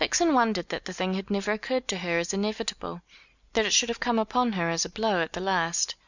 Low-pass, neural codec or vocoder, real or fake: 7.2 kHz; none; real